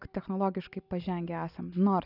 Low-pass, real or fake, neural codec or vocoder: 5.4 kHz; real; none